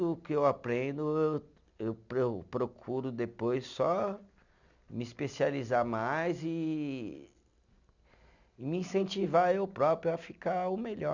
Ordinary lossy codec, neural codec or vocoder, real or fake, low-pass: none; none; real; 7.2 kHz